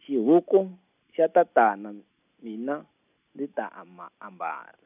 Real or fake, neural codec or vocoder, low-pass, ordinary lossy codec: real; none; 3.6 kHz; none